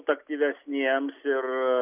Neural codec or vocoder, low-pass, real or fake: none; 3.6 kHz; real